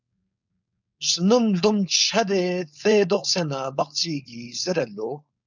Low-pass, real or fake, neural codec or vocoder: 7.2 kHz; fake; codec, 16 kHz, 4.8 kbps, FACodec